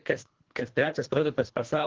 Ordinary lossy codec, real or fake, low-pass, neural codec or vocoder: Opus, 16 kbps; fake; 7.2 kHz; codec, 24 kHz, 1.5 kbps, HILCodec